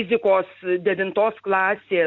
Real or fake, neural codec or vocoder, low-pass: real; none; 7.2 kHz